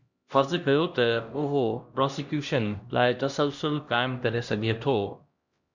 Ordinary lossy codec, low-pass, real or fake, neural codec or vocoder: Opus, 64 kbps; 7.2 kHz; fake; codec, 16 kHz, 1 kbps, X-Codec, HuBERT features, trained on LibriSpeech